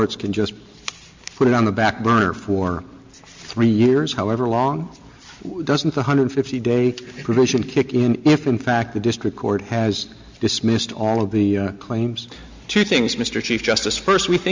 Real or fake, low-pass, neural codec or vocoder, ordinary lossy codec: real; 7.2 kHz; none; MP3, 64 kbps